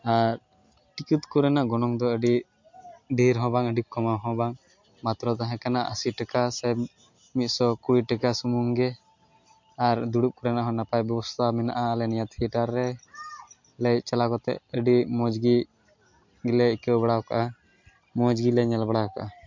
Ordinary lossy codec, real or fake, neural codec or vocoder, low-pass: MP3, 48 kbps; real; none; 7.2 kHz